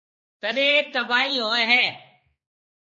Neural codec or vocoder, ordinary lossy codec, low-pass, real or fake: codec, 16 kHz, 2 kbps, X-Codec, HuBERT features, trained on balanced general audio; MP3, 32 kbps; 7.2 kHz; fake